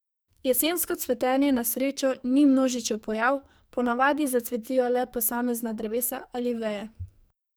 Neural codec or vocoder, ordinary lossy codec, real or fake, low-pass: codec, 44.1 kHz, 2.6 kbps, SNAC; none; fake; none